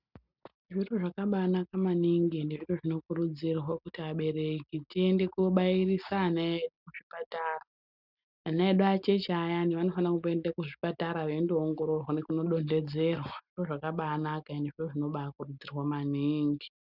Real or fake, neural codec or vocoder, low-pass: real; none; 5.4 kHz